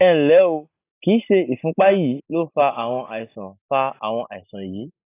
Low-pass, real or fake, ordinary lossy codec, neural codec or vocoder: 3.6 kHz; real; AAC, 24 kbps; none